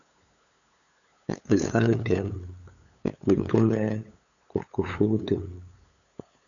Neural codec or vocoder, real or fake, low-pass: codec, 16 kHz, 8 kbps, FunCodec, trained on LibriTTS, 25 frames a second; fake; 7.2 kHz